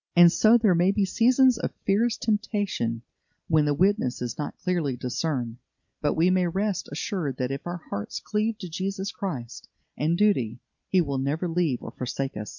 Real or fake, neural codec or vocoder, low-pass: real; none; 7.2 kHz